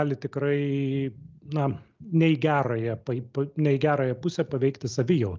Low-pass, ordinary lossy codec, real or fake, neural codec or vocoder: 7.2 kHz; Opus, 24 kbps; real; none